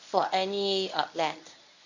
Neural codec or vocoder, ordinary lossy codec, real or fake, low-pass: codec, 24 kHz, 0.9 kbps, WavTokenizer, medium speech release version 1; none; fake; 7.2 kHz